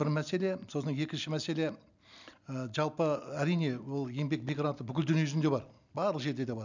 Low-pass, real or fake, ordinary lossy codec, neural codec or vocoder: 7.2 kHz; real; none; none